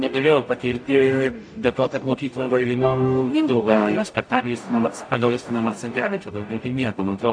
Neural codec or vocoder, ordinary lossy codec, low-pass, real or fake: codec, 44.1 kHz, 0.9 kbps, DAC; AAC, 64 kbps; 9.9 kHz; fake